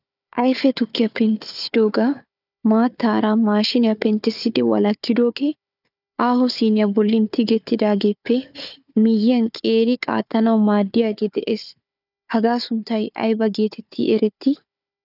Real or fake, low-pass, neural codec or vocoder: fake; 5.4 kHz; codec, 16 kHz, 4 kbps, FunCodec, trained on Chinese and English, 50 frames a second